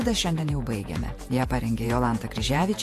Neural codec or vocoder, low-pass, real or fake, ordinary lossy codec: vocoder, 48 kHz, 128 mel bands, Vocos; 14.4 kHz; fake; AAC, 64 kbps